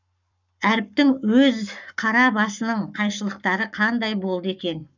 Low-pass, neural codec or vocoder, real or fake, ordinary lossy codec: 7.2 kHz; codec, 44.1 kHz, 7.8 kbps, Pupu-Codec; fake; none